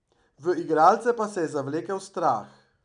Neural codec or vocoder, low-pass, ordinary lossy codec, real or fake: none; 9.9 kHz; none; real